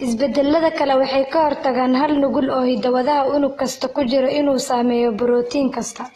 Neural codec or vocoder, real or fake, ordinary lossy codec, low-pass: none; real; AAC, 32 kbps; 14.4 kHz